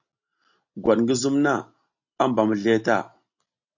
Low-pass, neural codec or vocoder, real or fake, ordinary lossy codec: 7.2 kHz; none; real; AAC, 48 kbps